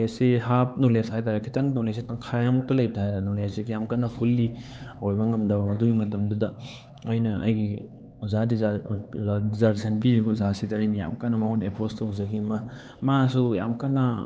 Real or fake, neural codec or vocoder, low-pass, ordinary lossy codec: fake; codec, 16 kHz, 4 kbps, X-Codec, HuBERT features, trained on LibriSpeech; none; none